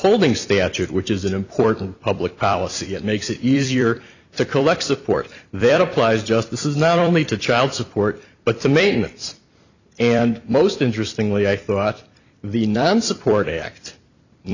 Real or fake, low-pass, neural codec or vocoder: fake; 7.2 kHz; vocoder, 44.1 kHz, 128 mel bands every 256 samples, BigVGAN v2